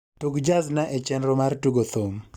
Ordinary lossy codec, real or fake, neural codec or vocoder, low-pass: Opus, 64 kbps; real; none; 19.8 kHz